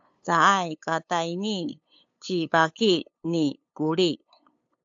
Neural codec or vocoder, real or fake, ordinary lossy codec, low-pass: codec, 16 kHz, 8 kbps, FunCodec, trained on LibriTTS, 25 frames a second; fake; AAC, 64 kbps; 7.2 kHz